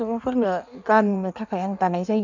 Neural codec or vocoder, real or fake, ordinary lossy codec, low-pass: codec, 16 kHz in and 24 kHz out, 1.1 kbps, FireRedTTS-2 codec; fake; none; 7.2 kHz